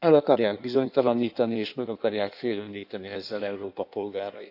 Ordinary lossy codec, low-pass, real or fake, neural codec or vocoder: AAC, 48 kbps; 5.4 kHz; fake; codec, 16 kHz in and 24 kHz out, 1.1 kbps, FireRedTTS-2 codec